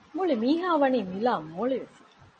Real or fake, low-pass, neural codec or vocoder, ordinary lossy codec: real; 10.8 kHz; none; MP3, 32 kbps